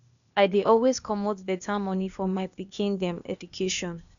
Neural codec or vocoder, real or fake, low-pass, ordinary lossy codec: codec, 16 kHz, 0.8 kbps, ZipCodec; fake; 7.2 kHz; none